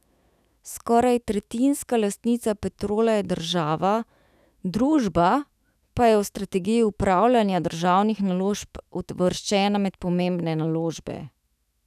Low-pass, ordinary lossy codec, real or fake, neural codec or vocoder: 14.4 kHz; none; fake; autoencoder, 48 kHz, 128 numbers a frame, DAC-VAE, trained on Japanese speech